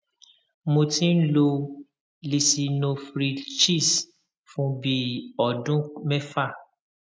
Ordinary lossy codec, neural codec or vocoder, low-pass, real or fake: none; none; none; real